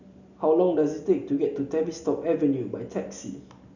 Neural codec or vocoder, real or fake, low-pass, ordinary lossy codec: none; real; 7.2 kHz; none